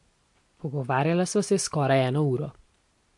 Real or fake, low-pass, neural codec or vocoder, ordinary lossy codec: real; 10.8 kHz; none; MP3, 48 kbps